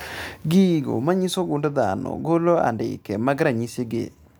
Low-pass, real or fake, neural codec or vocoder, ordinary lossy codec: none; real; none; none